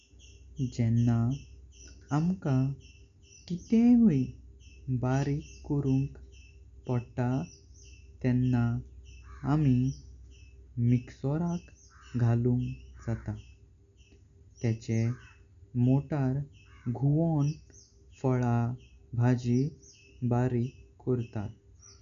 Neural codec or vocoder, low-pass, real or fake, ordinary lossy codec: none; 7.2 kHz; real; none